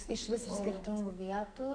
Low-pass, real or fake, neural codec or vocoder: 9.9 kHz; fake; codec, 44.1 kHz, 2.6 kbps, SNAC